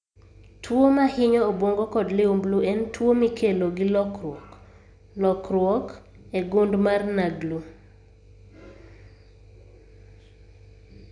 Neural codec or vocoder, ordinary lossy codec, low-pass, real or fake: none; none; 9.9 kHz; real